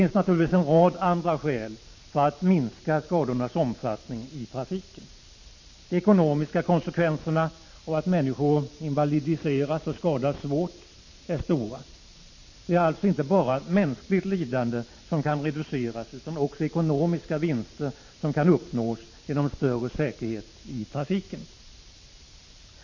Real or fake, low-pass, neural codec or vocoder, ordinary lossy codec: fake; 7.2 kHz; vocoder, 44.1 kHz, 128 mel bands every 512 samples, BigVGAN v2; MP3, 32 kbps